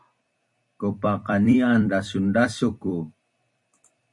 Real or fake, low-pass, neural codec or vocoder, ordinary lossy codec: fake; 10.8 kHz; vocoder, 44.1 kHz, 128 mel bands every 256 samples, BigVGAN v2; MP3, 48 kbps